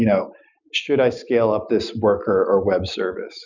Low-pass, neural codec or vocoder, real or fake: 7.2 kHz; none; real